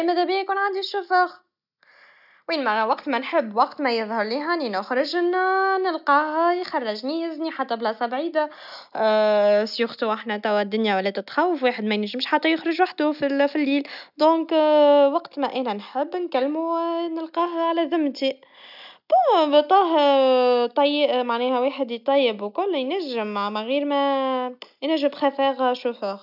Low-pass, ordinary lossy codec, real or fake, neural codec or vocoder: 5.4 kHz; none; real; none